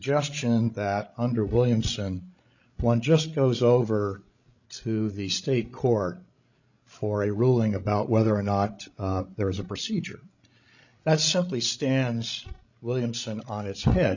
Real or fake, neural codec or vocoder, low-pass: fake; codec, 16 kHz, 16 kbps, FreqCodec, larger model; 7.2 kHz